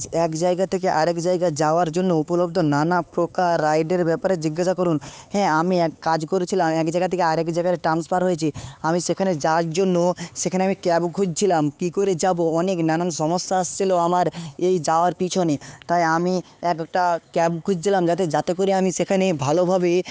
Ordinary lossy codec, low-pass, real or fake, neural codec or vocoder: none; none; fake; codec, 16 kHz, 4 kbps, X-Codec, HuBERT features, trained on LibriSpeech